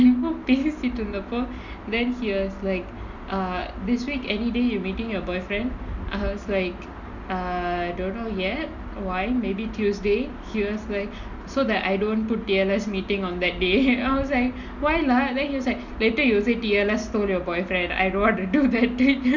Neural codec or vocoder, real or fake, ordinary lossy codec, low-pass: none; real; none; 7.2 kHz